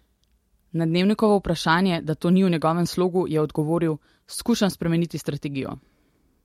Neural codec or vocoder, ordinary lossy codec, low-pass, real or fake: none; MP3, 64 kbps; 19.8 kHz; real